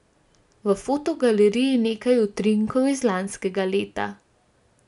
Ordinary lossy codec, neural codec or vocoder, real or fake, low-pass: none; none; real; 10.8 kHz